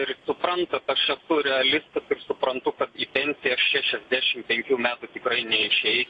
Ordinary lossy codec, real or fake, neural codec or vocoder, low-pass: AAC, 48 kbps; real; none; 10.8 kHz